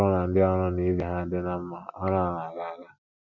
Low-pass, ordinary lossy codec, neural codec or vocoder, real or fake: 7.2 kHz; none; none; real